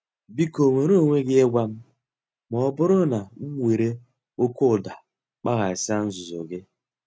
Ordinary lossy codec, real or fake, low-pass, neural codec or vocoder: none; real; none; none